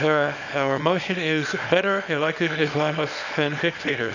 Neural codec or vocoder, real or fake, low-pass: codec, 24 kHz, 0.9 kbps, WavTokenizer, small release; fake; 7.2 kHz